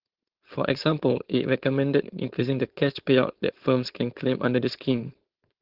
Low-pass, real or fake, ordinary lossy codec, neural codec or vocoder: 5.4 kHz; fake; Opus, 16 kbps; codec, 16 kHz, 4.8 kbps, FACodec